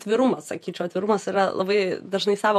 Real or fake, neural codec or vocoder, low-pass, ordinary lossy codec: fake; vocoder, 48 kHz, 128 mel bands, Vocos; 14.4 kHz; MP3, 64 kbps